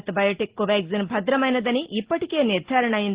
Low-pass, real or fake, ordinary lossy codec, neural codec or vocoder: 3.6 kHz; real; Opus, 16 kbps; none